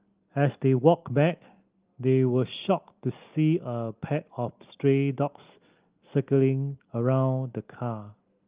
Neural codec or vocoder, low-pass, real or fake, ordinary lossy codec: none; 3.6 kHz; real; Opus, 32 kbps